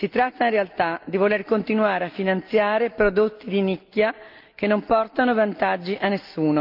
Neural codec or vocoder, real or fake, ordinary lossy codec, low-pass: none; real; Opus, 24 kbps; 5.4 kHz